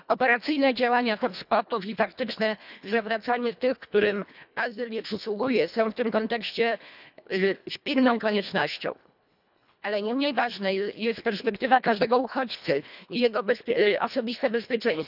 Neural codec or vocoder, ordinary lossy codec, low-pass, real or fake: codec, 24 kHz, 1.5 kbps, HILCodec; none; 5.4 kHz; fake